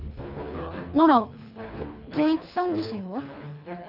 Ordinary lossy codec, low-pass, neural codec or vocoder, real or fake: none; 5.4 kHz; codec, 24 kHz, 3 kbps, HILCodec; fake